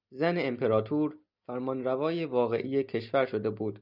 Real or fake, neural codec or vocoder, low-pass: real; none; 5.4 kHz